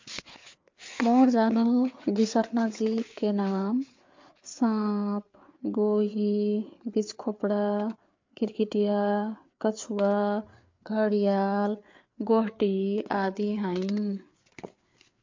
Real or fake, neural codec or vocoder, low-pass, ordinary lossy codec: fake; codec, 16 kHz, 4 kbps, FreqCodec, larger model; 7.2 kHz; MP3, 48 kbps